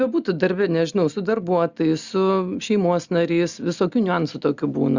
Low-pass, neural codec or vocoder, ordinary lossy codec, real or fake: 7.2 kHz; none; Opus, 64 kbps; real